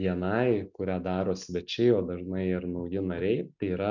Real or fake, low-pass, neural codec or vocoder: real; 7.2 kHz; none